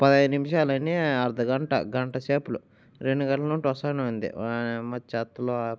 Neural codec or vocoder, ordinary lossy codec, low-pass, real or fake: none; none; none; real